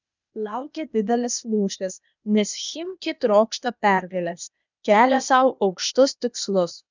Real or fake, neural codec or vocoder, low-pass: fake; codec, 16 kHz, 0.8 kbps, ZipCodec; 7.2 kHz